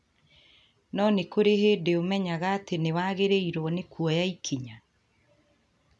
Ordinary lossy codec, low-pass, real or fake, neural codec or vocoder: none; none; real; none